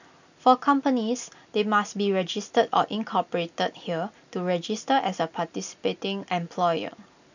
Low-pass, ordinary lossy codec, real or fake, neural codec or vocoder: 7.2 kHz; none; real; none